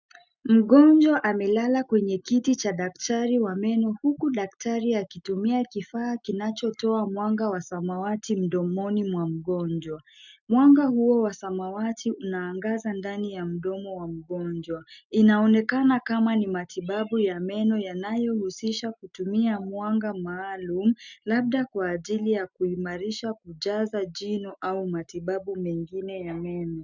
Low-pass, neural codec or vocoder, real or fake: 7.2 kHz; none; real